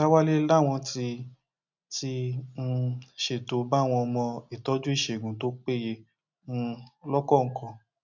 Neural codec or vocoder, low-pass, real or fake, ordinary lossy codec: none; 7.2 kHz; real; none